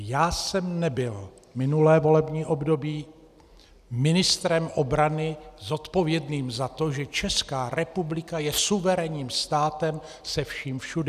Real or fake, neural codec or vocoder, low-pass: real; none; 14.4 kHz